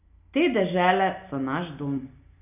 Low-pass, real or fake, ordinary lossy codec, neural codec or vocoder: 3.6 kHz; real; none; none